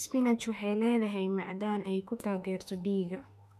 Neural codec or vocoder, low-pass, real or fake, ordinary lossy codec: autoencoder, 48 kHz, 32 numbers a frame, DAC-VAE, trained on Japanese speech; 14.4 kHz; fake; none